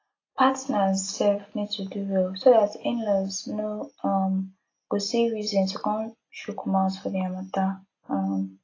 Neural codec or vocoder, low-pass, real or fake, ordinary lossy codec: none; 7.2 kHz; real; AAC, 32 kbps